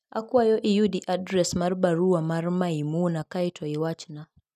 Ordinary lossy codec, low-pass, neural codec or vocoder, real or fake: none; 14.4 kHz; none; real